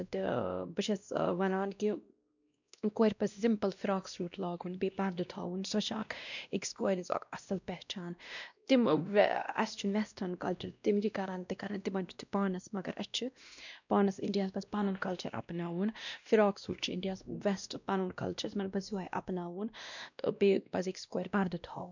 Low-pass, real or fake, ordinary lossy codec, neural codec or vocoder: 7.2 kHz; fake; none; codec, 16 kHz, 1 kbps, X-Codec, WavLM features, trained on Multilingual LibriSpeech